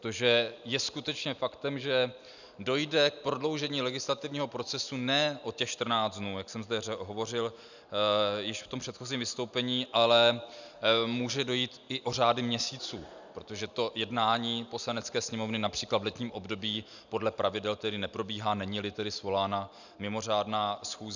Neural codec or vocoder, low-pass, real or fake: none; 7.2 kHz; real